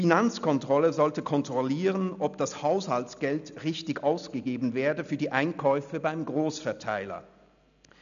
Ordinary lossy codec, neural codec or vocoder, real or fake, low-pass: none; none; real; 7.2 kHz